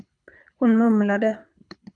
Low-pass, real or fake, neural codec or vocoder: 9.9 kHz; fake; codec, 24 kHz, 6 kbps, HILCodec